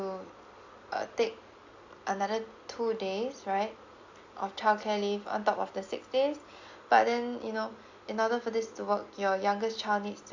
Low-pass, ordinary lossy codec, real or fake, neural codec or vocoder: 7.2 kHz; AAC, 48 kbps; real; none